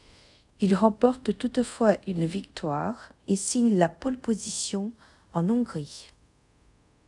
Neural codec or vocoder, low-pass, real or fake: codec, 24 kHz, 0.5 kbps, DualCodec; 10.8 kHz; fake